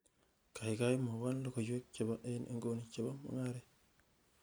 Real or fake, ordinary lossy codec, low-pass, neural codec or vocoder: real; none; none; none